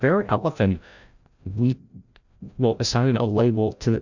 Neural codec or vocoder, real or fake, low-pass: codec, 16 kHz, 0.5 kbps, FreqCodec, larger model; fake; 7.2 kHz